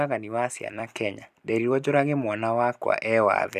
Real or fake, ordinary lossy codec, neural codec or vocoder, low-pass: fake; none; vocoder, 44.1 kHz, 128 mel bands every 256 samples, BigVGAN v2; 14.4 kHz